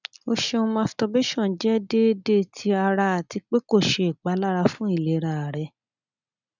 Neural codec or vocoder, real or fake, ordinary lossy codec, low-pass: none; real; none; 7.2 kHz